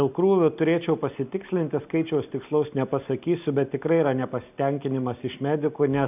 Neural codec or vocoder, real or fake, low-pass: none; real; 3.6 kHz